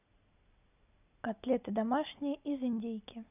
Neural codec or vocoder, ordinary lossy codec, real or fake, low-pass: none; none; real; 3.6 kHz